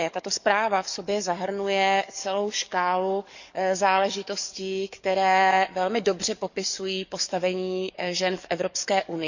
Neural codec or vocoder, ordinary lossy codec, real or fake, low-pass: codec, 44.1 kHz, 7.8 kbps, DAC; none; fake; 7.2 kHz